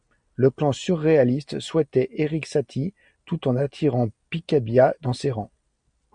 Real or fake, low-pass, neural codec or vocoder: real; 9.9 kHz; none